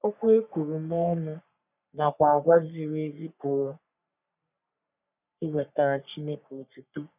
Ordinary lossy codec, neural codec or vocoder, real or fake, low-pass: none; codec, 44.1 kHz, 3.4 kbps, Pupu-Codec; fake; 3.6 kHz